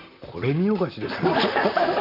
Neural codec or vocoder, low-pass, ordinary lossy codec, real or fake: vocoder, 44.1 kHz, 128 mel bands, Pupu-Vocoder; 5.4 kHz; none; fake